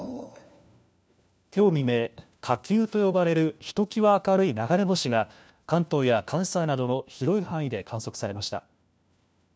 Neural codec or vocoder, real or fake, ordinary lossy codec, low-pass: codec, 16 kHz, 1 kbps, FunCodec, trained on LibriTTS, 50 frames a second; fake; none; none